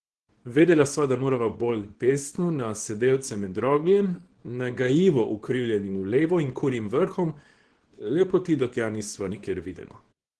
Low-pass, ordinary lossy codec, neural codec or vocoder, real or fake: 10.8 kHz; Opus, 16 kbps; codec, 24 kHz, 0.9 kbps, WavTokenizer, small release; fake